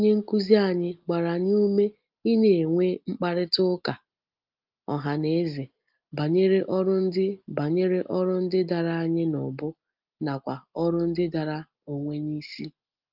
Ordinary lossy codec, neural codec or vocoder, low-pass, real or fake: Opus, 24 kbps; none; 5.4 kHz; real